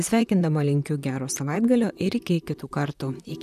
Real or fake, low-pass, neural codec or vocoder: fake; 14.4 kHz; vocoder, 44.1 kHz, 128 mel bands, Pupu-Vocoder